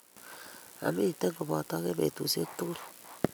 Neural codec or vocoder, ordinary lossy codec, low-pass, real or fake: none; none; none; real